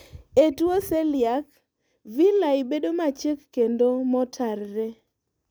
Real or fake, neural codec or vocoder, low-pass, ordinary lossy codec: real; none; none; none